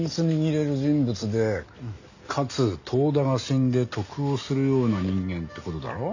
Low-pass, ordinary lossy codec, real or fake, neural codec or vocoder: 7.2 kHz; none; real; none